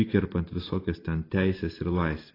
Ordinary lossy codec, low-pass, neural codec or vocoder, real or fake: AAC, 24 kbps; 5.4 kHz; none; real